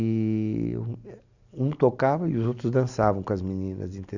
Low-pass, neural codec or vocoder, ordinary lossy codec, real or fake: 7.2 kHz; none; none; real